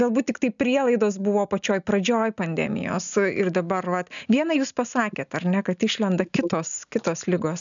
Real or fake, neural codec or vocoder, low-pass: real; none; 7.2 kHz